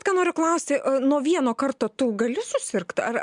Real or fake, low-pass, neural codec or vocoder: real; 10.8 kHz; none